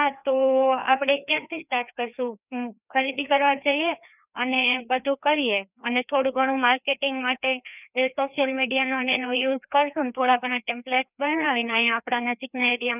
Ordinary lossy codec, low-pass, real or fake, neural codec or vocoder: none; 3.6 kHz; fake; codec, 16 kHz, 2 kbps, FreqCodec, larger model